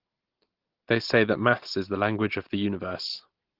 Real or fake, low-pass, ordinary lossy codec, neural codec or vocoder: real; 5.4 kHz; Opus, 16 kbps; none